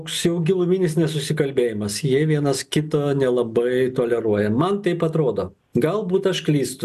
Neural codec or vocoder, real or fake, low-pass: none; real; 14.4 kHz